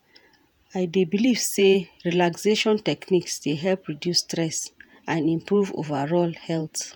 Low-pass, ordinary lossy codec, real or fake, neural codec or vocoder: none; none; fake; vocoder, 48 kHz, 128 mel bands, Vocos